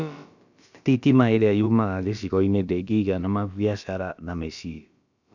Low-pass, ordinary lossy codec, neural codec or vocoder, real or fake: 7.2 kHz; none; codec, 16 kHz, about 1 kbps, DyCAST, with the encoder's durations; fake